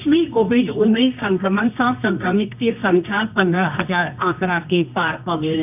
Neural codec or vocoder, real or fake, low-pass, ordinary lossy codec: codec, 24 kHz, 0.9 kbps, WavTokenizer, medium music audio release; fake; 3.6 kHz; none